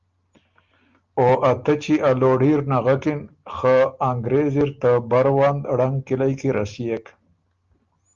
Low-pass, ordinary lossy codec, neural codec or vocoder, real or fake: 7.2 kHz; Opus, 32 kbps; none; real